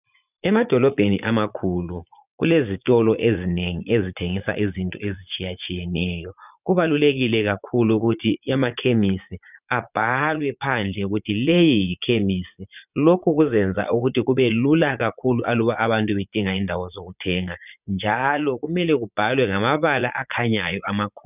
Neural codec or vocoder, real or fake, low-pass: vocoder, 44.1 kHz, 80 mel bands, Vocos; fake; 3.6 kHz